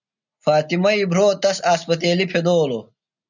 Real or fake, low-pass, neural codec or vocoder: real; 7.2 kHz; none